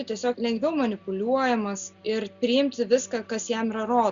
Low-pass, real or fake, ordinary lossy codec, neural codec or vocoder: 7.2 kHz; real; Opus, 64 kbps; none